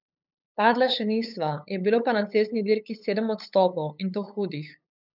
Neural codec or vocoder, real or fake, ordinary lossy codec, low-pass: codec, 16 kHz, 8 kbps, FunCodec, trained on LibriTTS, 25 frames a second; fake; none; 5.4 kHz